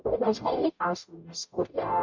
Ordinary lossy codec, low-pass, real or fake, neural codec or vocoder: MP3, 64 kbps; 7.2 kHz; fake; codec, 44.1 kHz, 0.9 kbps, DAC